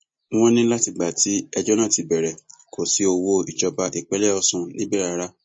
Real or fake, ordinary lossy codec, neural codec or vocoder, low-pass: real; MP3, 32 kbps; none; 9.9 kHz